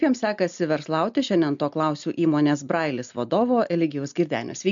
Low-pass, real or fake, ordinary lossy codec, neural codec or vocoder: 7.2 kHz; real; MP3, 64 kbps; none